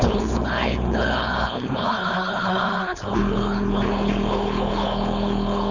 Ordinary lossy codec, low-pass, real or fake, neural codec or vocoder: none; 7.2 kHz; fake; codec, 16 kHz, 4.8 kbps, FACodec